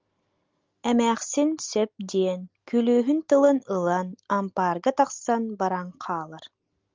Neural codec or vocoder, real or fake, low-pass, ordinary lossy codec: none; real; 7.2 kHz; Opus, 32 kbps